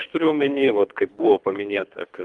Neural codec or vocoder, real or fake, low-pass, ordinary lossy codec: codec, 24 kHz, 3 kbps, HILCodec; fake; 10.8 kHz; Opus, 24 kbps